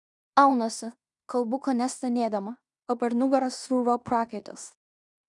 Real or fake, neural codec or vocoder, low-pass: fake; codec, 16 kHz in and 24 kHz out, 0.9 kbps, LongCat-Audio-Codec, fine tuned four codebook decoder; 10.8 kHz